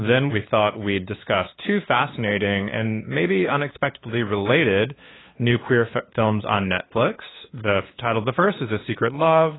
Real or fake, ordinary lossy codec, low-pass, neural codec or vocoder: fake; AAC, 16 kbps; 7.2 kHz; codec, 16 kHz, 2 kbps, FunCodec, trained on LibriTTS, 25 frames a second